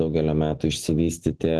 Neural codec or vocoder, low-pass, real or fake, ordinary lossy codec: none; 10.8 kHz; real; Opus, 16 kbps